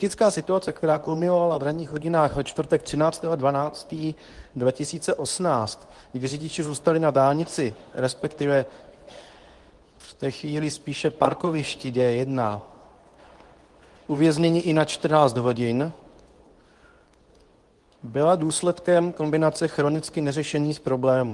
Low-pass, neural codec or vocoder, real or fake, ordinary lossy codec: 10.8 kHz; codec, 24 kHz, 0.9 kbps, WavTokenizer, medium speech release version 2; fake; Opus, 24 kbps